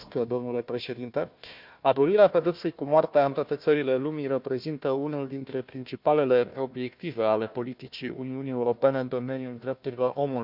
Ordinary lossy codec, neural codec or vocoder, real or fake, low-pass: none; codec, 16 kHz, 1 kbps, FunCodec, trained on Chinese and English, 50 frames a second; fake; 5.4 kHz